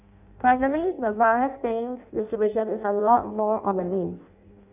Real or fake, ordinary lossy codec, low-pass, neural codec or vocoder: fake; none; 3.6 kHz; codec, 16 kHz in and 24 kHz out, 0.6 kbps, FireRedTTS-2 codec